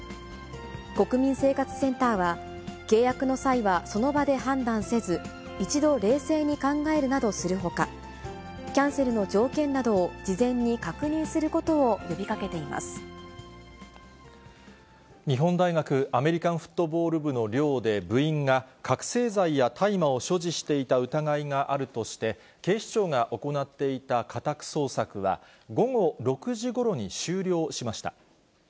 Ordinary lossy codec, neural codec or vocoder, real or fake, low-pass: none; none; real; none